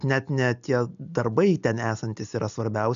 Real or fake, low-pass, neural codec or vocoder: real; 7.2 kHz; none